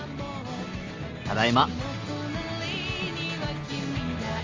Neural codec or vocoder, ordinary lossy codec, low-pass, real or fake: none; Opus, 32 kbps; 7.2 kHz; real